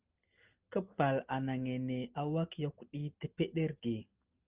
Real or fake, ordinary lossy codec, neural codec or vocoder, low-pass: real; Opus, 24 kbps; none; 3.6 kHz